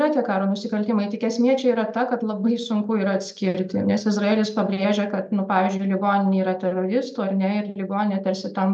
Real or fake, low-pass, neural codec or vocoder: real; 9.9 kHz; none